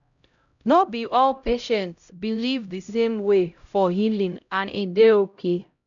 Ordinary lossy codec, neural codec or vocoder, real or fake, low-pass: none; codec, 16 kHz, 0.5 kbps, X-Codec, HuBERT features, trained on LibriSpeech; fake; 7.2 kHz